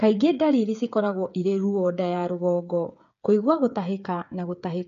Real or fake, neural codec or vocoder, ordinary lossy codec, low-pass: fake; codec, 16 kHz, 8 kbps, FreqCodec, smaller model; none; 7.2 kHz